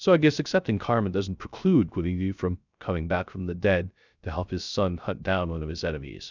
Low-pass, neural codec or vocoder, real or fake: 7.2 kHz; codec, 16 kHz, 0.3 kbps, FocalCodec; fake